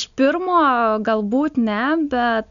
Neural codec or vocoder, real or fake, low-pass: none; real; 7.2 kHz